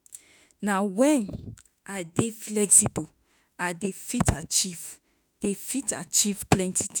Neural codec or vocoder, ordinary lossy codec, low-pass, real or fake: autoencoder, 48 kHz, 32 numbers a frame, DAC-VAE, trained on Japanese speech; none; none; fake